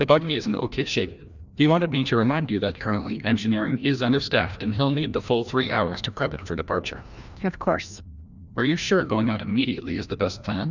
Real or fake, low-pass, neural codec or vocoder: fake; 7.2 kHz; codec, 16 kHz, 1 kbps, FreqCodec, larger model